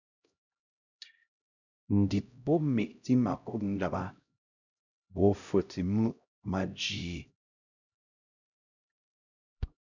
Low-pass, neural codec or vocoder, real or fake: 7.2 kHz; codec, 16 kHz, 0.5 kbps, X-Codec, HuBERT features, trained on LibriSpeech; fake